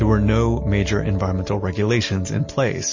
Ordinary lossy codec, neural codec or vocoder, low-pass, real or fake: MP3, 32 kbps; none; 7.2 kHz; real